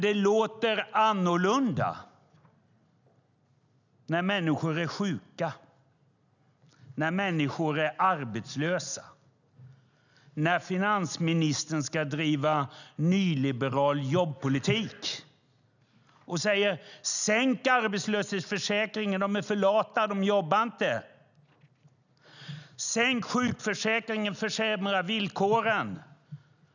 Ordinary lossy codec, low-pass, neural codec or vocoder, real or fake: none; 7.2 kHz; none; real